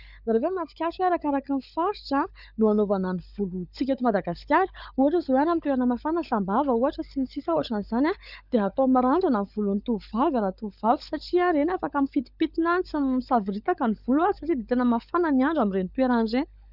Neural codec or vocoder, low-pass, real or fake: codec, 16 kHz, 16 kbps, FunCodec, trained on LibriTTS, 50 frames a second; 5.4 kHz; fake